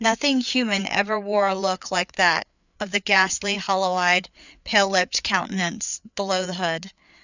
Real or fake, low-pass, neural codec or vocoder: fake; 7.2 kHz; codec, 16 kHz, 4 kbps, FreqCodec, larger model